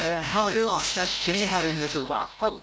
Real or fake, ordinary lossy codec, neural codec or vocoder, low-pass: fake; none; codec, 16 kHz, 0.5 kbps, FreqCodec, larger model; none